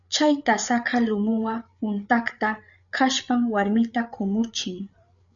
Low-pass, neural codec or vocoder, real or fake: 7.2 kHz; codec, 16 kHz, 8 kbps, FreqCodec, larger model; fake